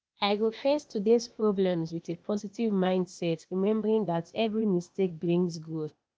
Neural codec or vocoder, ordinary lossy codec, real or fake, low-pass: codec, 16 kHz, 0.8 kbps, ZipCodec; none; fake; none